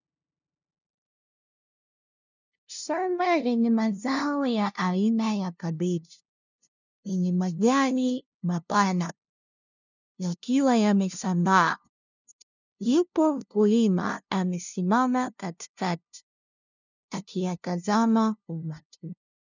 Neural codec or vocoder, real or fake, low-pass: codec, 16 kHz, 0.5 kbps, FunCodec, trained on LibriTTS, 25 frames a second; fake; 7.2 kHz